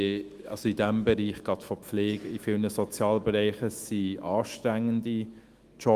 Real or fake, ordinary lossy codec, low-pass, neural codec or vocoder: fake; Opus, 32 kbps; 14.4 kHz; autoencoder, 48 kHz, 128 numbers a frame, DAC-VAE, trained on Japanese speech